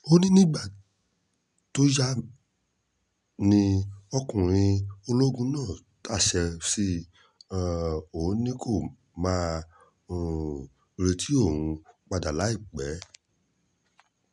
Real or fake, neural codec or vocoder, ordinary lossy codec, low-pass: real; none; none; 10.8 kHz